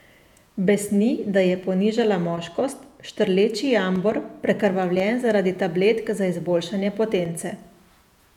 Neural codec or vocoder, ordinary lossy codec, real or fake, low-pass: vocoder, 48 kHz, 128 mel bands, Vocos; none; fake; 19.8 kHz